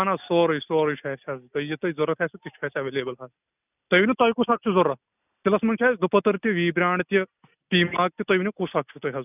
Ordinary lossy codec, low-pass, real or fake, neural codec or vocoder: none; 3.6 kHz; real; none